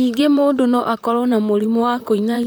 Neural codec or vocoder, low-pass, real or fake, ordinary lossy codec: vocoder, 44.1 kHz, 128 mel bands, Pupu-Vocoder; none; fake; none